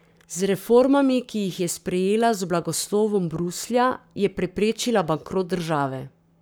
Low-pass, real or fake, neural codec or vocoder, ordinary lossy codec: none; fake; codec, 44.1 kHz, 7.8 kbps, Pupu-Codec; none